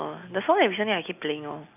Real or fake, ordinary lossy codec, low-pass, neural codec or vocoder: real; none; 3.6 kHz; none